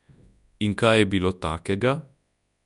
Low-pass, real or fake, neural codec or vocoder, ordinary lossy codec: 10.8 kHz; fake; codec, 24 kHz, 0.9 kbps, WavTokenizer, large speech release; none